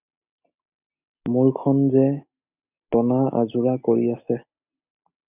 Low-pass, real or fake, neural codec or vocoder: 3.6 kHz; real; none